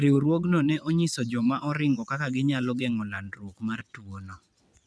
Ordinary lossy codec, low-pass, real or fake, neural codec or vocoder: none; none; fake; vocoder, 22.05 kHz, 80 mel bands, WaveNeXt